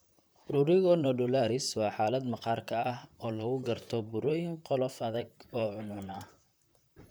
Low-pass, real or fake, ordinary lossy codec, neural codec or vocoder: none; fake; none; vocoder, 44.1 kHz, 128 mel bands, Pupu-Vocoder